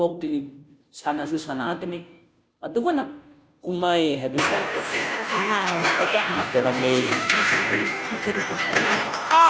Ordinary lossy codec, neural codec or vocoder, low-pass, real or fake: none; codec, 16 kHz, 0.5 kbps, FunCodec, trained on Chinese and English, 25 frames a second; none; fake